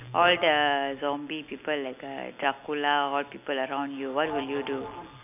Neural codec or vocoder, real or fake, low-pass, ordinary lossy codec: none; real; 3.6 kHz; none